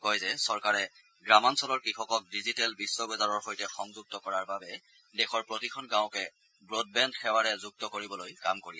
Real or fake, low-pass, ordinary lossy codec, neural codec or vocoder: real; none; none; none